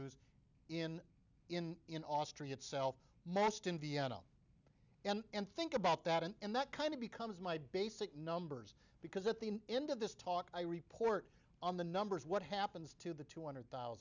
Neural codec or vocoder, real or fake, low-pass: none; real; 7.2 kHz